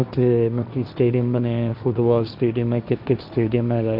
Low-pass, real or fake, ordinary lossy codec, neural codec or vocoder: 5.4 kHz; fake; none; codec, 16 kHz, 1.1 kbps, Voila-Tokenizer